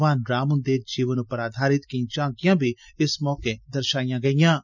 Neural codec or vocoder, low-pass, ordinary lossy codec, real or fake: none; 7.2 kHz; none; real